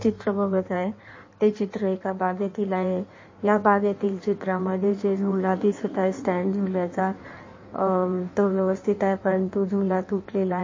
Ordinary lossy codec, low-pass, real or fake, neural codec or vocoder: MP3, 32 kbps; 7.2 kHz; fake; codec, 16 kHz in and 24 kHz out, 1.1 kbps, FireRedTTS-2 codec